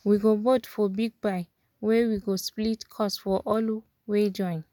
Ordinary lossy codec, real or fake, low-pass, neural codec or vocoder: none; real; 19.8 kHz; none